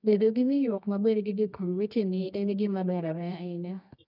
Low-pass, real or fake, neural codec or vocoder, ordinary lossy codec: 5.4 kHz; fake; codec, 24 kHz, 0.9 kbps, WavTokenizer, medium music audio release; none